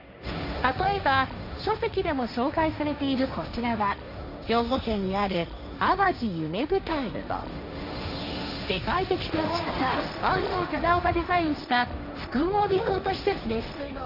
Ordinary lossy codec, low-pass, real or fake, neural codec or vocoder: none; 5.4 kHz; fake; codec, 16 kHz, 1.1 kbps, Voila-Tokenizer